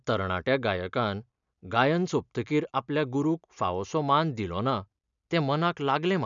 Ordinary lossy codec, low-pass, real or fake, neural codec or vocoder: none; 7.2 kHz; real; none